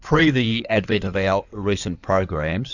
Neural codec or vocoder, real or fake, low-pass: codec, 16 kHz in and 24 kHz out, 2.2 kbps, FireRedTTS-2 codec; fake; 7.2 kHz